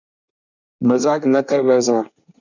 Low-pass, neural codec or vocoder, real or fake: 7.2 kHz; codec, 24 kHz, 1 kbps, SNAC; fake